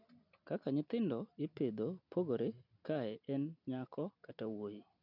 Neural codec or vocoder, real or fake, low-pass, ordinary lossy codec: none; real; 5.4 kHz; none